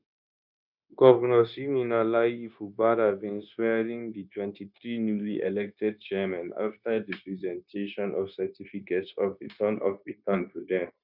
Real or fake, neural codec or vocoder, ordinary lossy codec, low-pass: fake; codec, 16 kHz, 0.9 kbps, LongCat-Audio-Codec; none; 5.4 kHz